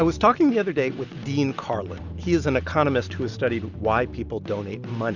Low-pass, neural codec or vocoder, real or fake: 7.2 kHz; none; real